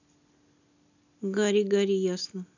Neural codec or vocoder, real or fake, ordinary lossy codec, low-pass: none; real; none; 7.2 kHz